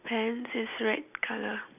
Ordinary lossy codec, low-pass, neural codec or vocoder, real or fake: none; 3.6 kHz; none; real